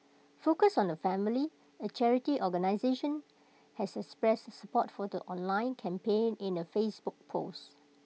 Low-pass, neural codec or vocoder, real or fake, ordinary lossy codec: none; none; real; none